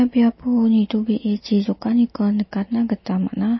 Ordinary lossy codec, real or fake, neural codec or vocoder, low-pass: MP3, 24 kbps; real; none; 7.2 kHz